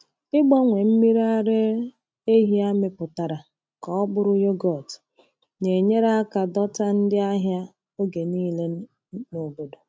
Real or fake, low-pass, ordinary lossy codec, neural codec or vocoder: real; none; none; none